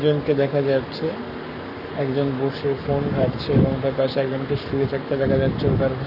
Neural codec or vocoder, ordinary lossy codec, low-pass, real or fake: codec, 44.1 kHz, 7.8 kbps, Pupu-Codec; none; 5.4 kHz; fake